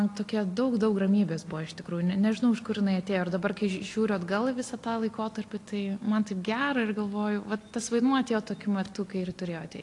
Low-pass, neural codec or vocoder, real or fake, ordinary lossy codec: 10.8 kHz; none; real; AAC, 48 kbps